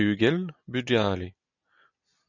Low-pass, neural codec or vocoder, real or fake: 7.2 kHz; none; real